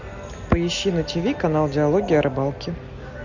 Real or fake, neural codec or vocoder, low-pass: real; none; 7.2 kHz